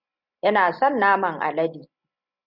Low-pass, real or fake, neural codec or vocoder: 5.4 kHz; real; none